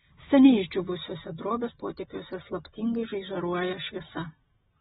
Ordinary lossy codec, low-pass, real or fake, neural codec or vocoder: AAC, 16 kbps; 7.2 kHz; real; none